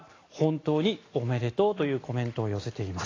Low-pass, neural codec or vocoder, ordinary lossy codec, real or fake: 7.2 kHz; none; AAC, 32 kbps; real